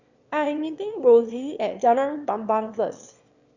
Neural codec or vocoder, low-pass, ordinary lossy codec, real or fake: autoencoder, 22.05 kHz, a latent of 192 numbers a frame, VITS, trained on one speaker; 7.2 kHz; Opus, 64 kbps; fake